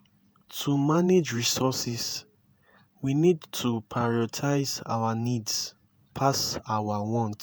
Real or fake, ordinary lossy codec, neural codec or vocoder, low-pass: fake; none; vocoder, 48 kHz, 128 mel bands, Vocos; none